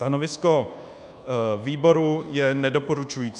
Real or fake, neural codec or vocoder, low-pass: fake; codec, 24 kHz, 1.2 kbps, DualCodec; 10.8 kHz